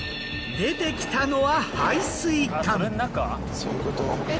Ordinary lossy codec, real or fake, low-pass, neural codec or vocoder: none; real; none; none